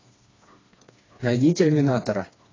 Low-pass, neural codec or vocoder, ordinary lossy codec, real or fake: 7.2 kHz; codec, 16 kHz, 2 kbps, FreqCodec, smaller model; AAC, 32 kbps; fake